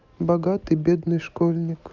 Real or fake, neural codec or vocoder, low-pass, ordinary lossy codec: real; none; 7.2 kHz; Opus, 32 kbps